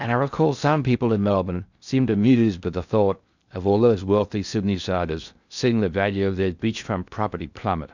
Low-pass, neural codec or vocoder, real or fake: 7.2 kHz; codec, 16 kHz in and 24 kHz out, 0.6 kbps, FocalCodec, streaming, 4096 codes; fake